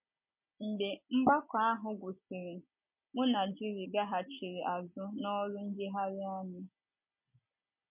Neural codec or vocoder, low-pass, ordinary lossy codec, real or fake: none; 3.6 kHz; MP3, 32 kbps; real